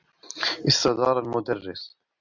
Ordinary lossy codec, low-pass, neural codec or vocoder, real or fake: MP3, 64 kbps; 7.2 kHz; none; real